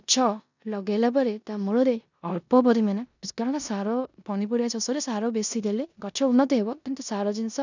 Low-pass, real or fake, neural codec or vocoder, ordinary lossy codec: 7.2 kHz; fake; codec, 16 kHz in and 24 kHz out, 0.9 kbps, LongCat-Audio-Codec, four codebook decoder; none